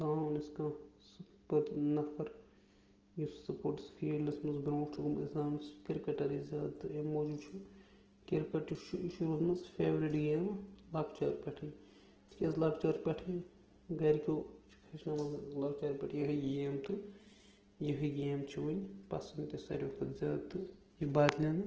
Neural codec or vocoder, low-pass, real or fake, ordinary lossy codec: none; 7.2 kHz; real; Opus, 16 kbps